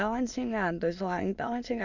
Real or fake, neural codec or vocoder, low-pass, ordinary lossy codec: fake; autoencoder, 22.05 kHz, a latent of 192 numbers a frame, VITS, trained on many speakers; 7.2 kHz; none